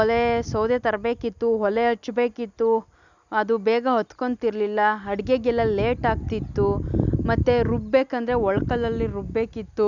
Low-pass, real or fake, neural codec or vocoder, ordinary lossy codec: 7.2 kHz; real; none; none